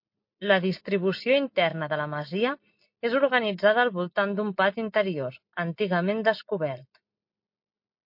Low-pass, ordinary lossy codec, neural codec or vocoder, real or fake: 5.4 kHz; MP3, 32 kbps; none; real